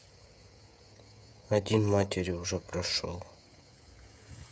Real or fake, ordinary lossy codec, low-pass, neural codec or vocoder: fake; none; none; codec, 16 kHz, 16 kbps, FreqCodec, smaller model